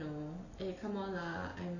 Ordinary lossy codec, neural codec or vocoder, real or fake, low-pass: none; none; real; 7.2 kHz